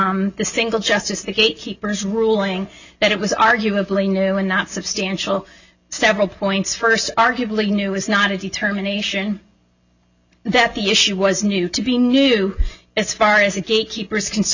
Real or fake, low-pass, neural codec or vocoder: real; 7.2 kHz; none